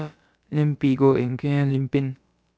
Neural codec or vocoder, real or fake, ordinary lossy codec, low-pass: codec, 16 kHz, about 1 kbps, DyCAST, with the encoder's durations; fake; none; none